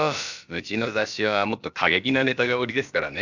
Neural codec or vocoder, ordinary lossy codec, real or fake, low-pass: codec, 16 kHz, about 1 kbps, DyCAST, with the encoder's durations; none; fake; 7.2 kHz